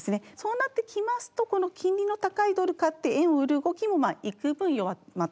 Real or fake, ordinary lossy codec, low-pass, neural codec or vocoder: real; none; none; none